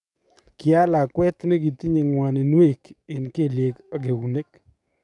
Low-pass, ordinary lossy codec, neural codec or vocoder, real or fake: 10.8 kHz; none; codec, 44.1 kHz, 7.8 kbps, DAC; fake